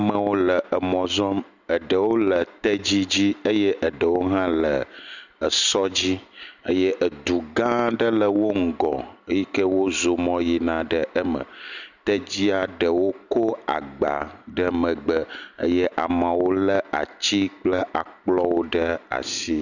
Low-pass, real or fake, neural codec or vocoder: 7.2 kHz; real; none